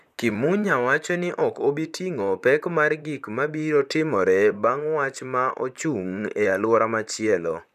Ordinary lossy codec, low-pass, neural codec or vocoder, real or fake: none; 14.4 kHz; vocoder, 44.1 kHz, 128 mel bands, Pupu-Vocoder; fake